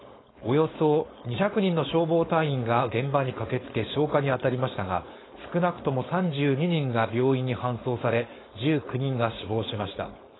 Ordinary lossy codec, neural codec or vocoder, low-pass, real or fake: AAC, 16 kbps; codec, 16 kHz, 4.8 kbps, FACodec; 7.2 kHz; fake